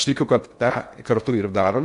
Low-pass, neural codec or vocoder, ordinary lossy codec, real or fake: 10.8 kHz; codec, 16 kHz in and 24 kHz out, 0.8 kbps, FocalCodec, streaming, 65536 codes; AAC, 64 kbps; fake